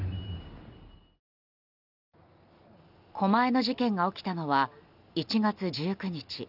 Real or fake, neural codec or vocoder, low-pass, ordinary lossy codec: real; none; 5.4 kHz; none